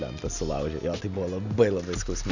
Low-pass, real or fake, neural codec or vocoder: 7.2 kHz; real; none